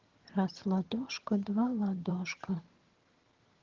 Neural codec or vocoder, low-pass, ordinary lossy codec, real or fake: vocoder, 22.05 kHz, 80 mel bands, HiFi-GAN; 7.2 kHz; Opus, 16 kbps; fake